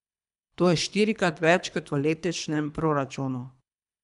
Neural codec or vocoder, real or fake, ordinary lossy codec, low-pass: codec, 24 kHz, 3 kbps, HILCodec; fake; none; 10.8 kHz